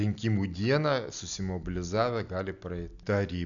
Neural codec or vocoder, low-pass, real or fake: none; 7.2 kHz; real